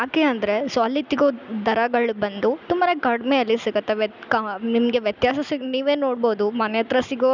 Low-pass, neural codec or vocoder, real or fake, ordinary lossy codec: 7.2 kHz; none; real; none